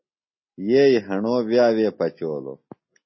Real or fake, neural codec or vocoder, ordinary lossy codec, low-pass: real; none; MP3, 24 kbps; 7.2 kHz